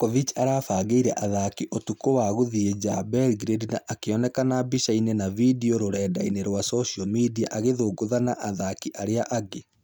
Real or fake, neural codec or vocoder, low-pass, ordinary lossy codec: real; none; none; none